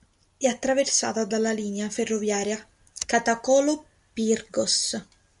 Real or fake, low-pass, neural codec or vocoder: real; 10.8 kHz; none